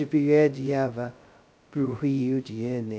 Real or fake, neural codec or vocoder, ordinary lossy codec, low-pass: fake; codec, 16 kHz, 0.2 kbps, FocalCodec; none; none